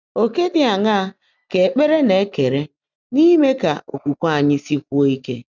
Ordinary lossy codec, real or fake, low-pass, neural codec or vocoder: none; real; 7.2 kHz; none